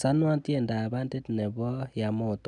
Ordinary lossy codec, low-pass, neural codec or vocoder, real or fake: none; 10.8 kHz; none; real